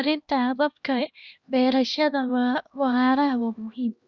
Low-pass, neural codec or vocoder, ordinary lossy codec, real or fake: 7.2 kHz; codec, 16 kHz, 1 kbps, X-Codec, HuBERT features, trained on LibriSpeech; Opus, 64 kbps; fake